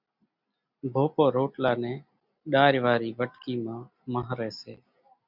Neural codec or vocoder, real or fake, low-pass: none; real; 5.4 kHz